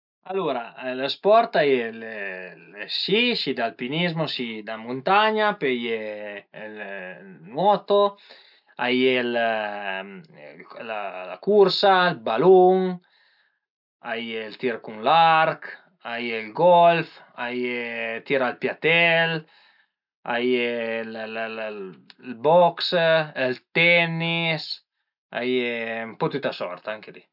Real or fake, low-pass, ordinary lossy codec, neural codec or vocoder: real; 5.4 kHz; none; none